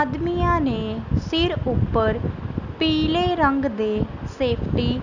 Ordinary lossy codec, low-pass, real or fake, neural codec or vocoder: none; 7.2 kHz; real; none